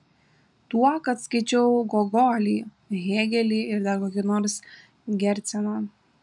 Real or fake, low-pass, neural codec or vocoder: real; 10.8 kHz; none